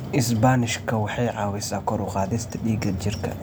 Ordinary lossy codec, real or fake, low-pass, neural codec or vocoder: none; real; none; none